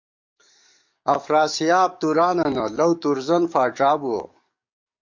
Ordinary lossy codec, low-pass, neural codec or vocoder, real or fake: MP3, 48 kbps; 7.2 kHz; codec, 44.1 kHz, 7.8 kbps, DAC; fake